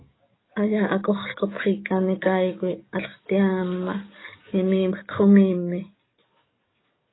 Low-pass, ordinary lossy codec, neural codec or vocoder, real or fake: 7.2 kHz; AAC, 16 kbps; codec, 44.1 kHz, 7.8 kbps, DAC; fake